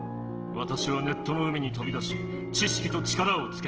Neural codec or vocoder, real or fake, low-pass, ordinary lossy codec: none; real; 7.2 kHz; Opus, 16 kbps